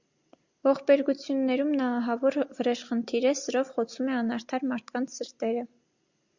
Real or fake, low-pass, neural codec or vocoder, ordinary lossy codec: real; 7.2 kHz; none; Opus, 64 kbps